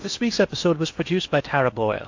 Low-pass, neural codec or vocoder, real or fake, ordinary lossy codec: 7.2 kHz; codec, 16 kHz in and 24 kHz out, 0.8 kbps, FocalCodec, streaming, 65536 codes; fake; AAC, 48 kbps